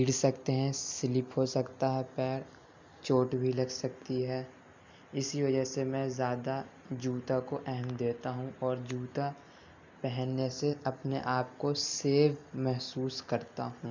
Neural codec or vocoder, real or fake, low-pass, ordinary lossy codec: none; real; 7.2 kHz; MP3, 64 kbps